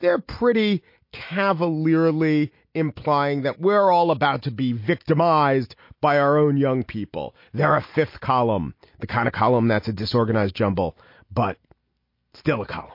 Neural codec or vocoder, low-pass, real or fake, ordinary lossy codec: none; 5.4 kHz; real; MP3, 32 kbps